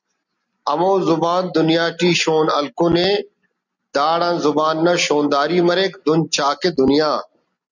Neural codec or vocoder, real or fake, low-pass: none; real; 7.2 kHz